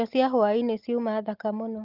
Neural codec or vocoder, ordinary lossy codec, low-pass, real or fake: none; Opus, 32 kbps; 5.4 kHz; real